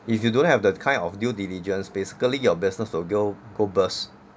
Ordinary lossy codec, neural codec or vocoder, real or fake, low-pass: none; none; real; none